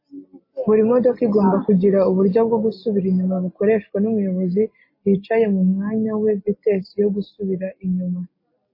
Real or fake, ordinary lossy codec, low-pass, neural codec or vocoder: real; MP3, 24 kbps; 5.4 kHz; none